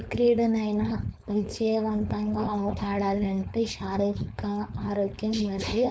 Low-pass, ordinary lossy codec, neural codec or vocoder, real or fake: none; none; codec, 16 kHz, 4.8 kbps, FACodec; fake